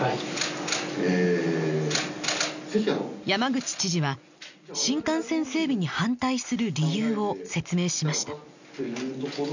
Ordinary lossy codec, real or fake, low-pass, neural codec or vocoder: none; real; 7.2 kHz; none